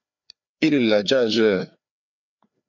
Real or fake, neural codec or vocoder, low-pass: fake; codec, 16 kHz, 2 kbps, FreqCodec, larger model; 7.2 kHz